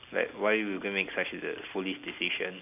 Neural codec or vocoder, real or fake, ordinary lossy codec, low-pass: none; real; none; 3.6 kHz